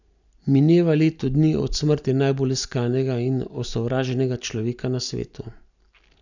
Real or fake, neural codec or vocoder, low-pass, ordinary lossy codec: real; none; 7.2 kHz; none